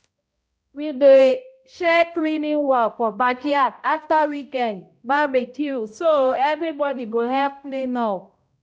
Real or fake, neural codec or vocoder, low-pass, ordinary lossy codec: fake; codec, 16 kHz, 0.5 kbps, X-Codec, HuBERT features, trained on balanced general audio; none; none